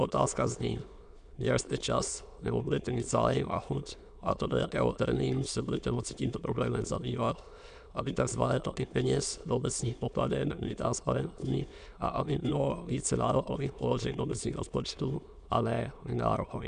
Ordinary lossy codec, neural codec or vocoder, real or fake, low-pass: MP3, 96 kbps; autoencoder, 22.05 kHz, a latent of 192 numbers a frame, VITS, trained on many speakers; fake; 9.9 kHz